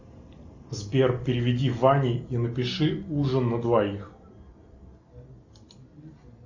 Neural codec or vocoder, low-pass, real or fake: none; 7.2 kHz; real